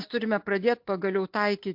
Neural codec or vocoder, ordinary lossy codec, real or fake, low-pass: none; MP3, 48 kbps; real; 5.4 kHz